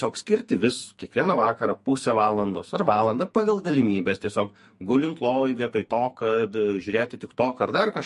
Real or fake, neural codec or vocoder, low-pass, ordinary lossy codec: fake; codec, 44.1 kHz, 2.6 kbps, SNAC; 14.4 kHz; MP3, 48 kbps